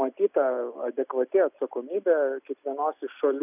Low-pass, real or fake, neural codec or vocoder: 3.6 kHz; real; none